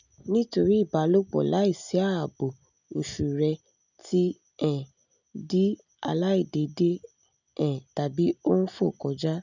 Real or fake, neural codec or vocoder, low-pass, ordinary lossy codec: real; none; 7.2 kHz; none